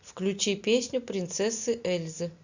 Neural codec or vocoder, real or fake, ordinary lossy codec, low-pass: none; real; Opus, 64 kbps; 7.2 kHz